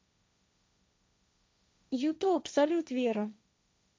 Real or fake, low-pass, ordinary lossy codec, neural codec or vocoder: fake; none; none; codec, 16 kHz, 1.1 kbps, Voila-Tokenizer